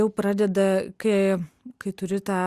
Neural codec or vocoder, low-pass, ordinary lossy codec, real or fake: none; 14.4 kHz; Opus, 64 kbps; real